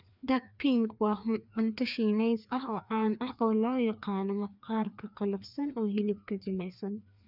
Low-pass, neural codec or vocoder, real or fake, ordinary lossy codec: 5.4 kHz; codec, 16 kHz, 2 kbps, FreqCodec, larger model; fake; none